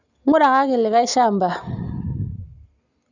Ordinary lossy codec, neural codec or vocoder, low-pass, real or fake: Opus, 64 kbps; none; 7.2 kHz; real